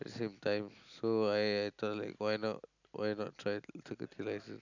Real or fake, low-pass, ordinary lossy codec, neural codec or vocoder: real; 7.2 kHz; none; none